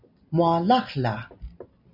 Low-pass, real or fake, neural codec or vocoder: 5.4 kHz; real; none